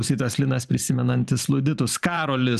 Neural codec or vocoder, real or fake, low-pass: vocoder, 44.1 kHz, 128 mel bands every 512 samples, BigVGAN v2; fake; 14.4 kHz